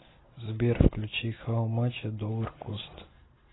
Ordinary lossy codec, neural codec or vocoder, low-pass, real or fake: AAC, 16 kbps; none; 7.2 kHz; real